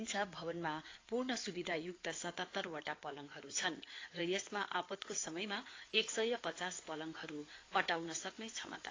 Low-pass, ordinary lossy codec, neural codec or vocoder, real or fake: 7.2 kHz; AAC, 32 kbps; codec, 16 kHz in and 24 kHz out, 2.2 kbps, FireRedTTS-2 codec; fake